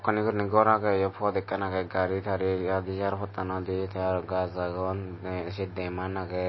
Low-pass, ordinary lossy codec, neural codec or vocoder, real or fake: 7.2 kHz; MP3, 24 kbps; none; real